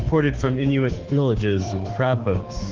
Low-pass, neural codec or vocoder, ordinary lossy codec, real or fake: 7.2 kHz; autoencoder, 48 kHz, 32 numbers a frame, DAC-VAE, trained on Japanese speech; Opus, 16 kbps; fake